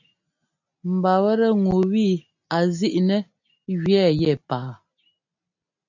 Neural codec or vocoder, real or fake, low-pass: none; real; 7.2 kHz